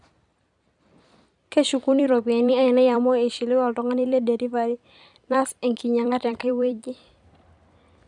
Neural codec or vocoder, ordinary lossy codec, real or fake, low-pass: vocoder, 44.1 kHz, 128 mel bands every 256 samples, BigVGAN v2; none; fake; 10.8 kHz